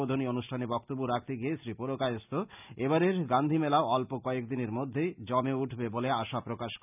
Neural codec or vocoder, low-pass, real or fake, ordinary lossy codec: none; 3.6 kHz; real; none